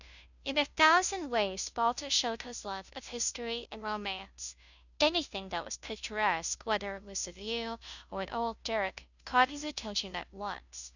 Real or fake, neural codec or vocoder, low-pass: fake; codec, 16 kHz, 0.5 kbps, FunCodec, trained on Chinese and English, 25 frames a second; 7.2 kHz